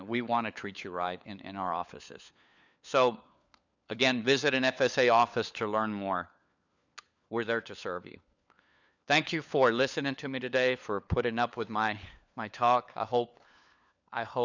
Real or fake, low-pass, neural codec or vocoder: fake; 7.2 kHz; codec, 16 kHz, 4 kbps, FunCodec, trained on LibriTTS, 50 frames a second